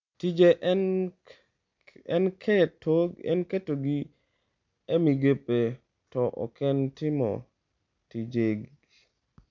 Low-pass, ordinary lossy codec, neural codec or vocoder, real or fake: 7.2 kHz; MP3, 64 kbps; none; real